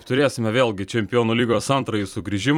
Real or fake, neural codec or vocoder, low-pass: fake; vocoder, 44.1 kHz, 128 mel bands every 256 samples, BigVGAN v2; 19.8 kHz